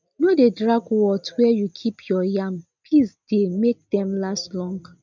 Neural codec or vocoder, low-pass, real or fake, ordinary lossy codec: none; 7.2 kHz; real; none